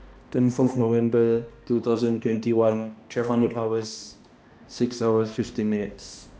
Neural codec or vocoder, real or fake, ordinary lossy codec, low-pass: codec, 16 kHz, 1 kbps, X-Codec, HuBERT features, trained on balanced general audio; fake; none; none